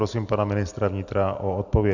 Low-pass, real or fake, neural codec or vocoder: 7.2 kHz; real; none